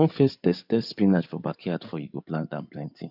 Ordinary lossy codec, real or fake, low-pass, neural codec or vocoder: none; fake; 5.4 kHz; codec, 16 kHz in and 24 kHz out, 2.2 kbps, FireRedTTS-2 codec